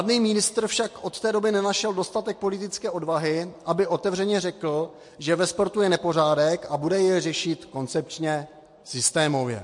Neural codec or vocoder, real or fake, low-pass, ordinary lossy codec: none; real; 10.8 kHz; MP3, 48 kbps